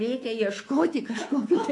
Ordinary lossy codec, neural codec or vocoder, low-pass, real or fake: AAC, 48 kbps; codec, 24 kHz, 3.1 kbps, DualCodec; 10.8 kHz; fake